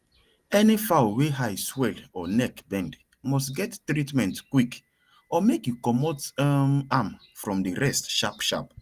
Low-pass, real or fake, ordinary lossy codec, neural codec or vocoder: 14.4 kHz; real; Opus, 24 kbps; none